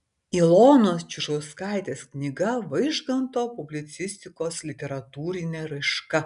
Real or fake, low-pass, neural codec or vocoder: real; 10.8 kHz; none